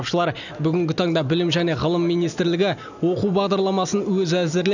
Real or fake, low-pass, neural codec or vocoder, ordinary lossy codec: real; 7.2 kHz; none; none